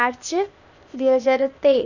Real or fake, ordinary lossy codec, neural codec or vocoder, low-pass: fake; none; codec, 16 kHz, 0.8 kbps, ZipCodec; 7.2 kHz